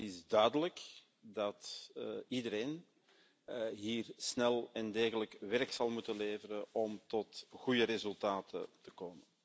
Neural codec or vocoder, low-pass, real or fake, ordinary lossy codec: none; none; real; none